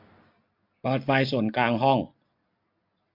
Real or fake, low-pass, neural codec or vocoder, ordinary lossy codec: real; 5.4 kHz; none; MP3, 48 kbps